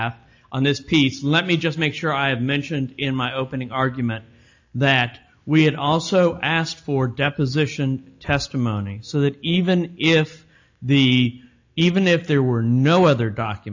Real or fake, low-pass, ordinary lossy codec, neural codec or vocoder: real; 7.2 kHz; AAC, 48 kbps; none